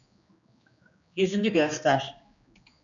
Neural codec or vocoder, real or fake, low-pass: codec, 16 kHz, 2 kbps, X-Codec, HuBERT features, trained on general audio; fake; 7.2 kHz